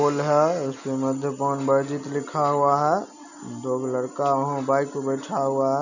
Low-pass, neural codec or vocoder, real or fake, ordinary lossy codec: 7.2 kHz; none; real; none